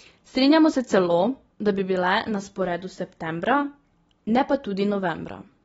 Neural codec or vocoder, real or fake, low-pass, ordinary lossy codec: none; real; 19.8 kHz; AAC, 24 kbps